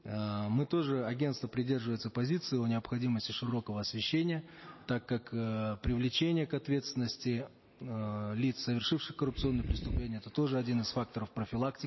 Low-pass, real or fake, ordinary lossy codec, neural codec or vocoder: 7.2 kHz; real; MP3, 24 kbps; none